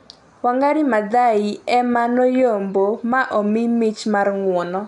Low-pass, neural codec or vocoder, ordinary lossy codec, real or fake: 10.8 kHz; none; none; real